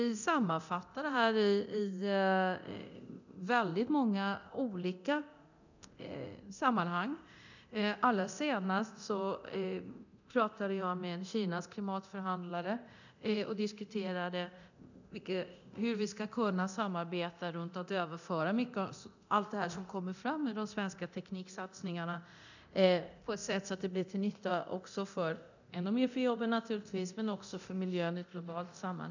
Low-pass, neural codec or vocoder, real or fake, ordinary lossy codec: 7.2 kHz; codec, 24 kHz, 0.9 kbps, DualCodec; fake; none